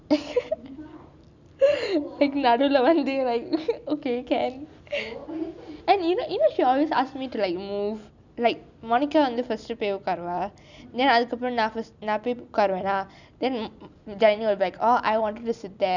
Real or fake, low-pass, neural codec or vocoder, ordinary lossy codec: real; 7.2 kHz; none; none